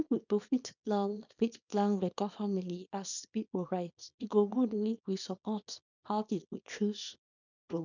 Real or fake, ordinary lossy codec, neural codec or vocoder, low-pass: fake; none; codec, 24 kHz, 0.9 kbps, WavTokenizer, small release; 7.2 kHz